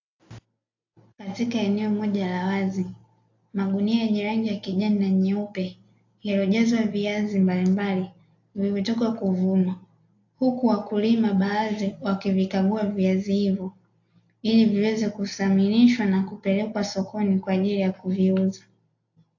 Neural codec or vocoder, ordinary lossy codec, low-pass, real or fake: none; AAC, 48 kbps; 7.2 kHz; real